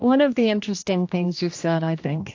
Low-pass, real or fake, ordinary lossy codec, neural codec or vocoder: 7.2 kHz; fake; AAC, 48 kbps; codec, 16 kHz, 1 kbps, X-Codec, HuBERT features, trained on general audio